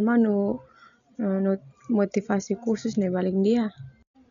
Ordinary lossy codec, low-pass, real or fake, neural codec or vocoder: none; 7.2 kHz; real; none